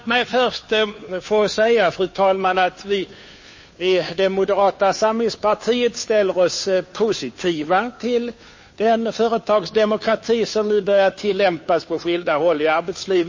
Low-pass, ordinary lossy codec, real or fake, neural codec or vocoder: 7.2 kHz; MP3, 32 kbps; fake; codec, 16 kHz, 2 kbps, FunCodec, trained on Chinese and English, 25 frames a second